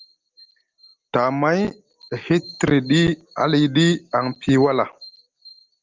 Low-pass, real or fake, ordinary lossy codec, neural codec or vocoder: 7.2 kHz; real; Opus, 32 kbps; none